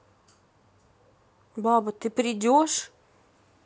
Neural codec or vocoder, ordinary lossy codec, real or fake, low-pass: none; none; real; none